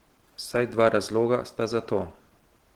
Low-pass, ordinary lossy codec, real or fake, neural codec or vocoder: 19.8 kHz; Opus, 16 kbps; real; none